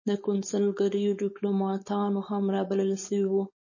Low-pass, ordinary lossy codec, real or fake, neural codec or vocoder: 7.2 kHz; MP3, 32 kbps; fake; codec, 16 kHz, 4.8 kbps, FACodec